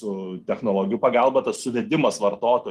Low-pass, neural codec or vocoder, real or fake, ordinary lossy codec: 14.4 kHz; none; real; Opus, 24 kbps